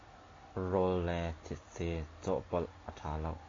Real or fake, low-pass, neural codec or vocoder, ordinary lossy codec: real; 7.2 kHz; none; AAC, 32 kbps